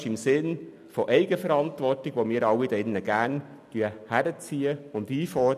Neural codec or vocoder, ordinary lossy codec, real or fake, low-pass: none; none; real; 14.4 kHz